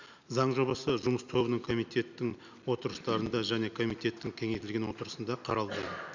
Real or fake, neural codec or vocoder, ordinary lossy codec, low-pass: real; none; none; 7.2 kHz